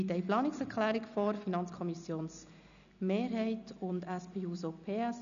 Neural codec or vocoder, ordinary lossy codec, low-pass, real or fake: none; none; 7.2 kHz; real